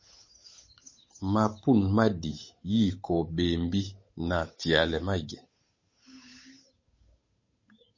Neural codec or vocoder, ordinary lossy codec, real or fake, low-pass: codec, 16 kHz, 8 kbps, FunCodec, trained on Chinese and English, 25 frames a second; MP3, 32 kbps; fake; 7.2 kHz